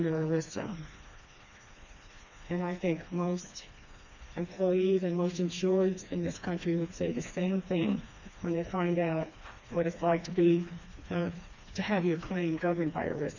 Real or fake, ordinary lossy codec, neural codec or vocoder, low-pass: fake; MP3, 64 kbps; codec, 16 kHz, 2 kbps, FreqCodec, smaller model; 7.2 kHz